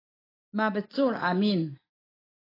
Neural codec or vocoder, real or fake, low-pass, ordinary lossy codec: none; real; 5.4 kHz; AAC, 24 kbps